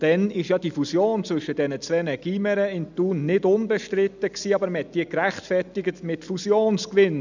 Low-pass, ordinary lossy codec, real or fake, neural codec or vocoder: 7.2 kHz; none; real; none